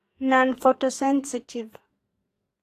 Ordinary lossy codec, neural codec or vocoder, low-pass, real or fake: AAC, 48 kbps; codec, 44.1 kHz, 2.6 kbps, SNAC; 14.4 kHz; fake